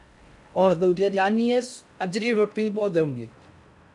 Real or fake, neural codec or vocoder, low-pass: fake; codec, 16 kHz in and 24 kHz out, 0.6 kbps, FocalCodec, streaming, 4096 codes; 10.8 kHz